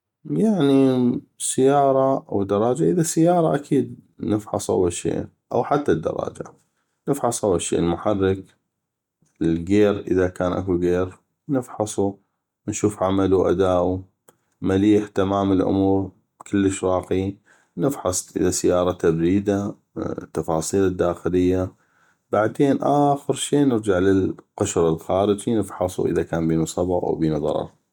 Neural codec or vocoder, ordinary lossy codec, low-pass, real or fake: none; MP3, 96 kbps; 19.8 kHz; real